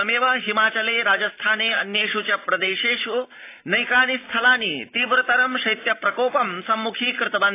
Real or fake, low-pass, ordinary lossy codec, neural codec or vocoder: real; 3.6 kHz; AAC, 24 kbps; none